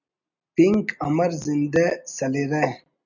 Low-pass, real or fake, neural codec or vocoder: 7.2 kHz; real; none